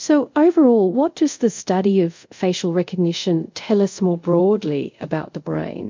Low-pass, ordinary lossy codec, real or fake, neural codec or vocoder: 7.2 kHz; MP3, 64 kbps; fake; codec, 24 kHz, 0.5 kbps, DualCodec